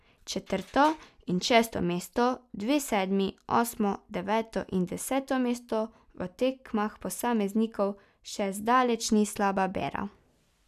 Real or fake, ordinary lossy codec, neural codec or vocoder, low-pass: real; none; none; 14.4 kHz